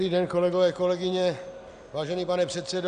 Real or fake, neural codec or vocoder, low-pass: real; none; 9.9 kHz